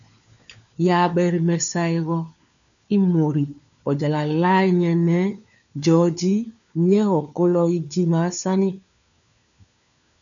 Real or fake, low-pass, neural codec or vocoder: fake; 7.2 kHz; codec, 16 kHz, 4 kbps, FunCodec, trained on LibriTTS, 50 frames a second